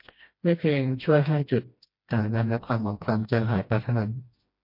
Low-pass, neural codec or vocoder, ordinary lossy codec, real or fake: 5.4 kHz; codec, 16 kHz, 1 kbps, FreqCodec, smaller model; MP3, 32 kbps; fake